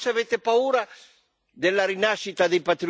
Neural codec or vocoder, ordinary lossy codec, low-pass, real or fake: none; none; none; real